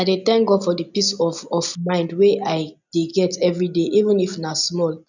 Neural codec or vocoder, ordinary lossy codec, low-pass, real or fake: none; none; 7.2 kHz; real